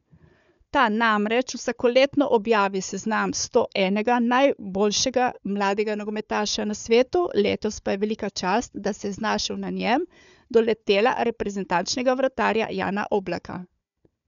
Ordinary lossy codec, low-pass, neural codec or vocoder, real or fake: none; 7.2 kHz; codec, 16 kHz, 4 kbps, FunCodec, trained on Chinese and English, 50 frames a second; fake